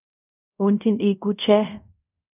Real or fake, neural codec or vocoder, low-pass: fake; codec, 24 kHz, 0.5 kbps, DualCodec; 3.6 kHz